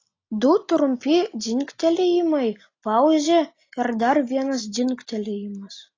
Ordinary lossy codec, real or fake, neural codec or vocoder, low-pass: AAC, 32 kbps; real; none; 7.2 kHz